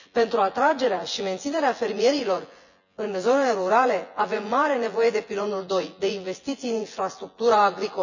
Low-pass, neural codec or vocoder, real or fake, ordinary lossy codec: 7.2 kHz; vocoder, 24 kHz, 100 mel bands, Vocos; fake; none